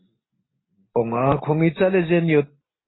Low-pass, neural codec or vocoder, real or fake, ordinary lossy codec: 7.2 kHz; none; real; AAC, 16 kbps